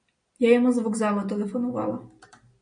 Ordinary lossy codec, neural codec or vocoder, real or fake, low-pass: MP3, 48 kbps; none; real; 9.9 kHz